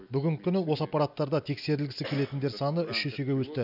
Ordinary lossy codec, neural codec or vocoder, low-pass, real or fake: none; none; 5.4 kHz; real